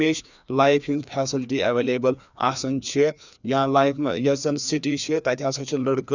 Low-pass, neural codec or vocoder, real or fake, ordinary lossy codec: 7.2 kHz; codec, 16 kHz, 2 kbps, FreqCodec, larger model; fake; none